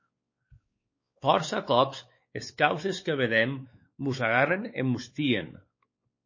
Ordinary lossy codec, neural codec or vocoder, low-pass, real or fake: MP3, 32 kbps; codec, 16 kHz, 4 kbps, X-Codec, WavLM features, trained on Multilingual LibriSpeech; 7.2 kHz; fake